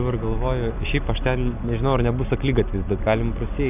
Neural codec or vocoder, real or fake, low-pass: none; real; 3.6 kHz